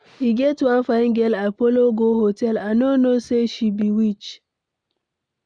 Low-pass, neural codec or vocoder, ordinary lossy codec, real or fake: 9.9 kHz; none; none; real